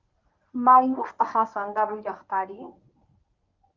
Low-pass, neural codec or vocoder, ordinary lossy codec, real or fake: 7.2 kHz; codec, 24 kHz, 0.9 kbps, WavTokenizer, medium speech release version 1; Opus, 32 kbps; fake